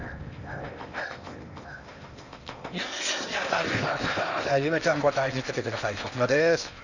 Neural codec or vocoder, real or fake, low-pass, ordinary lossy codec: codec, 16 kHz in and 24 kHz out, 0.8 kbps, FocalCodec, streaming, 65536 codes; fake; 7.2 kHz; none